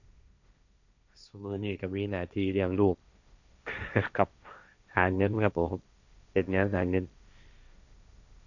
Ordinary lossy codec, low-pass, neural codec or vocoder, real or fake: none; 7.2 kHz; codec, 16 kHz, 1.1 kbps, Voila-Tokenizer; fake